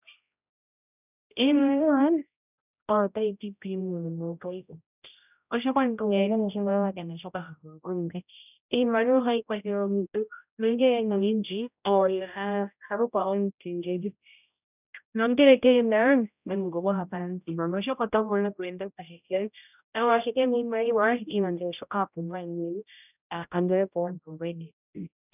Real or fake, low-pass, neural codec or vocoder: fake; 3.6 kHz; codec, 16 kHz, 0.5 kbps, X-Codec, HuBERT features, trained on general audio